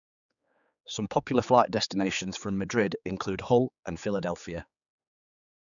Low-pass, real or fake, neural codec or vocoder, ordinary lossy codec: 7.2 kHz; fake; codec, 16 kHz, 4 kbps, X-Codec, HuBERT features, trained on general audio; none